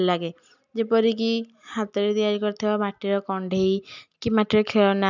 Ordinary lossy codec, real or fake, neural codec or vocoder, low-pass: none; real; none; 7.2 kHz